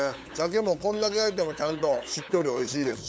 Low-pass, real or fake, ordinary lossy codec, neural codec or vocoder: none; fake; none; codec, 16 kHz, 8 kbps, FunCodec, trained on LibriTTS, 25 frames a second